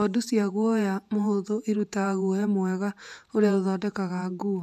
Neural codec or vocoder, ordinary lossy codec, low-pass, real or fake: vocoder, 48 kHz, 128 mel bands, Vocos; none; 14.4 kHz; fake